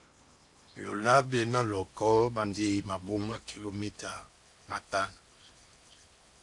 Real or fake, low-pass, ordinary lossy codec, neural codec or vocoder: fake; 10.8 kHz; AAC, 64 kbps; codec, 16 kHz in and 24 kHz out, 0.8 kbps, FocalCodec, streaming, 65536 codes